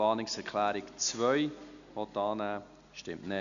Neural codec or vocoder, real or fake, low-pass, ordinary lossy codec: none; real; 7.2 kHz; none